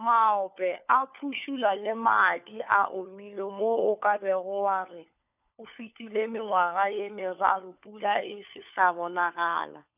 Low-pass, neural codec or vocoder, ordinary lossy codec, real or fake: 3.6 kHz; codec, 16 kHz, 4 kbps, FunCodec, trained on LibriTTS, 50 frames a second; none; fake